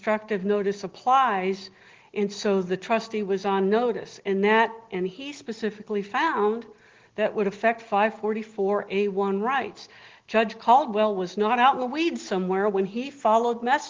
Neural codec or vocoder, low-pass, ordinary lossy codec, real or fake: none; 7.2 kHz; Opus, 16 kbps; real